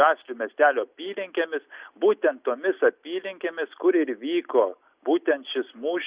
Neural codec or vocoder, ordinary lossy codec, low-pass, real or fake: none; Opus, 64 kbps; 3.6 kHz; real